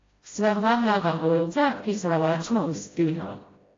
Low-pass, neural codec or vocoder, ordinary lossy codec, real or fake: 7.2 kHz; codec, 16 kHz, 0.5 kbps, FreqCodec, smaller model; AAC, 32 kbps; fake